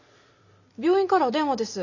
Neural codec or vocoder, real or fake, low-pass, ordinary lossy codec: none; real; 7.2 kHz; none